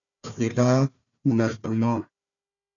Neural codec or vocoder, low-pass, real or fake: codec, 16 kHz, 1 kbps, FunCodec, trained on Chinese and English, 50 frames a second; 7.2 kHz; fake